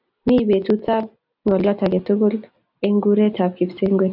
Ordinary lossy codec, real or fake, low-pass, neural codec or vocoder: AAC, 48 kbps; real; 5.4 kHz; none